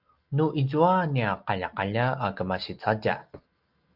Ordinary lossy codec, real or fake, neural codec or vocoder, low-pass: Opus, 32 kbps; real; none; 5.4 kHz